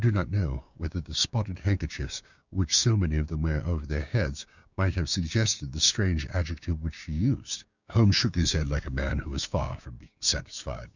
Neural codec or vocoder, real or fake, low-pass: codec, 16 kHz, 6 kbps, DAC; fake; 7.2 kHz